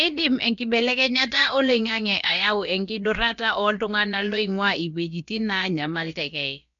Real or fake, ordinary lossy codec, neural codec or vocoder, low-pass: fake; none; codec, 16 kHz, about 1 kbps, DyCAST, with the encoder's durations; 7.2 kHz